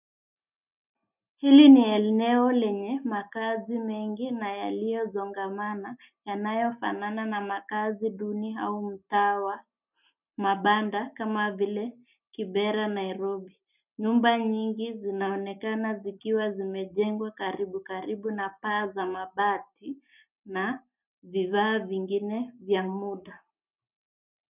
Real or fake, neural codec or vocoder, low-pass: real; none; 3.6 kHz